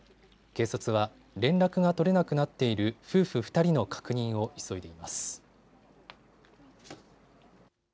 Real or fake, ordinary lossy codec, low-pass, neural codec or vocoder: real; none; none; none